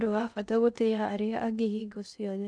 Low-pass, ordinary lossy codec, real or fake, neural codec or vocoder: 9.9 kHz; none; fake; codec, 16 kHz in and 24 kHz out, 0.6 kbps, FocalCodec, streaming, 2048 codes